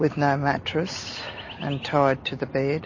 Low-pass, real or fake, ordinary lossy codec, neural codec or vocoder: 7.2 kHz; real; MP3, 32 kbps; none